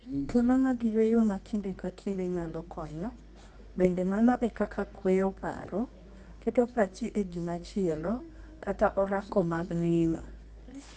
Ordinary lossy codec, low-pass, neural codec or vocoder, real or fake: none; none; codec, 24 kHz, 0.9 kbps, WavTokenizer, medium music audio release; fake